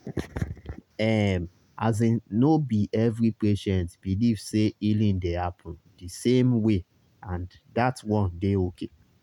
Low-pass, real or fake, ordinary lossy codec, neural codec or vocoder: 19.8 kHz; real; none; none